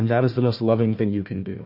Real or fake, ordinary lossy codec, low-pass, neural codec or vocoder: fake; MP3, 32 kbps; 5.4 kHz; codec, 16 kHz, 1 kbps, FunCodec, trained on Chinese and English, 50 frames a second